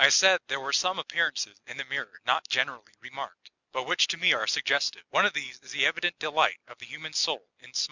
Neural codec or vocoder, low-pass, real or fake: none; 7.2 kHz; real